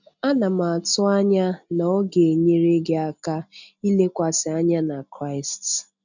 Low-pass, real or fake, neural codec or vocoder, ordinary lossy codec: 7.2 kHz; real; none; none